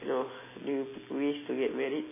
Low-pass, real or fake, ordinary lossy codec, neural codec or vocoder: 3.6 kHz; real; MP3, 16 kbps; none